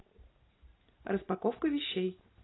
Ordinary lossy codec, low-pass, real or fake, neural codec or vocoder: AAC, 16 kbps; 7.2 kHz; real; none